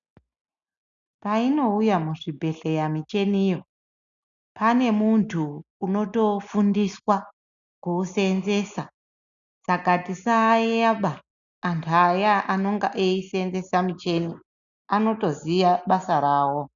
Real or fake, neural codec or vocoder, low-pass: real; none; 7.2 kHz